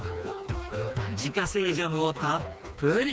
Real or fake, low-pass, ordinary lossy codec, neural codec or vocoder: fake; none; none; codec, 16 kHz, 2 kbps, FreqCodec, smaller model